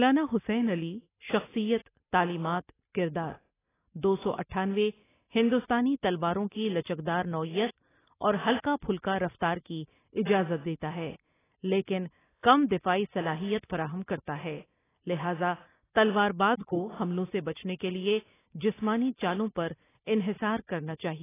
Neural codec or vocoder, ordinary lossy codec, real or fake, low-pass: none; AAC, 16 kbps; real; 3.6 kHz